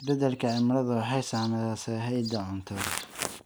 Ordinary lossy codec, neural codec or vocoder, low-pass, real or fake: none; none; none; real